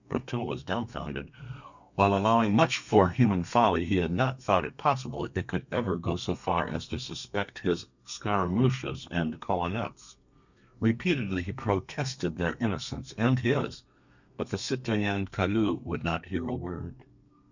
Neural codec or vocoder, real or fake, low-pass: codec, 32 kHz, 1.9 kbps, SNAC; fake; 7.2 kHz